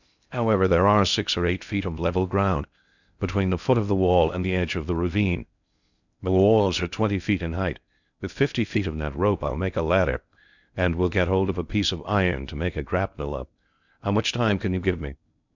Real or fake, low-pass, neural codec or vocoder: fake; 7.2 kHz; codec, 16 kHz in and 24 kHz out, 0.6 kbps, FocalCodec, streaming, 2048 codes